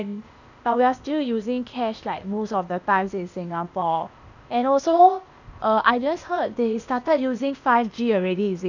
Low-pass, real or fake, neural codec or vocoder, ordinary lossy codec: 7.2 kHz; fake; codec, 16 kHz, 0.8 kbps, ZipCodec; none